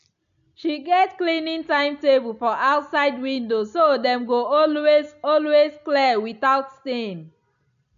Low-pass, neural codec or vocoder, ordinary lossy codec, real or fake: 7.2 kHz; none; AAC, 96 kbps; real